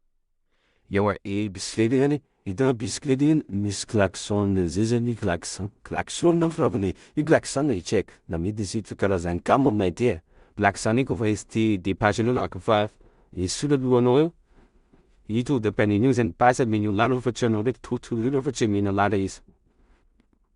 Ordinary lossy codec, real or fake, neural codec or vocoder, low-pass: Opus, 64 kbps; fake; codec, 16 kHz in and 24 kHz out, 0.4 kbps, LongCat-Audio-Codec, two codebook decoder; 10.8 kHz